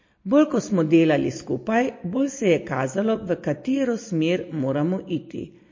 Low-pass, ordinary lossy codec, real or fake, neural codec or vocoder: 7.2 kHz; MP3, 32 kbps; real; none